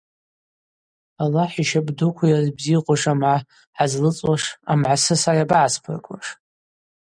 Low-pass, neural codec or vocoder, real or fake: 9.9 kHz; none; real